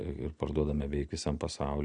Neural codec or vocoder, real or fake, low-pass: none; real; 9.9 kHz